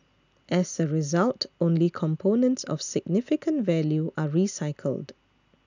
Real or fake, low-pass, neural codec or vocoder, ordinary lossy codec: real; 7.2 kHz; none; none